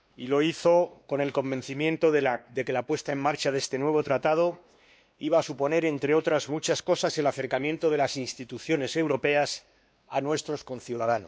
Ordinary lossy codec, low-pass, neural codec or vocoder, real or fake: none; none; codec, 16 kHz, 2 kbps, X-Codec, WavLM features, trained on Multilingual LibriSpeech; fake